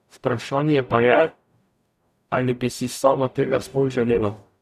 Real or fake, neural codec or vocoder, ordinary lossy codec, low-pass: fake; codec, 44.1 kHz, 0.9 kbps, DAC; none; 14.4 kHz